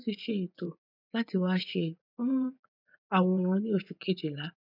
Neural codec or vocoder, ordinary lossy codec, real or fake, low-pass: vocoder, 24 kHz, 100 mel bands, Vocos; none; fake; 5.4 kHz